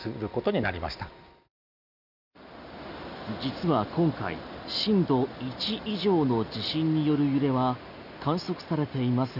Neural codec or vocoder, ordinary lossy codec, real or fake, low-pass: none; none; real; 5.4 kHz